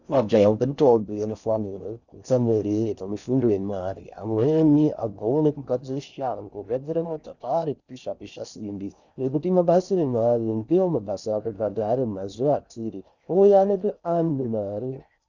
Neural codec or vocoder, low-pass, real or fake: codec, 16 kHz in and 24 kHz out, 0.6 kbps, FocalCodec, streaming, 4096 codes; 7.2 kHz; fake